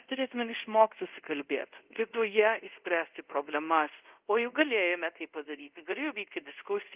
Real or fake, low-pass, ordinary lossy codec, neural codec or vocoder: fake; 3.6 kHz; Opus, 32 kbps; codec, 24 kHz, 0.5 kbps, DualCodec